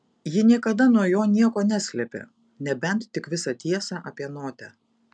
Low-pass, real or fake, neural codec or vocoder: 9.9 kHz; real; none